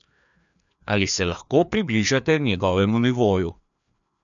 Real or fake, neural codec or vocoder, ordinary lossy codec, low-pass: fake; codec, 16 kHz, 2 kbps, FreqCodec, larger model; none; 7.2 kHz